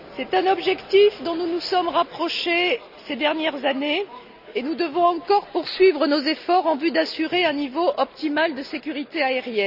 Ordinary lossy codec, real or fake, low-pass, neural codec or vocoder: none; real; 5.4 kHz; none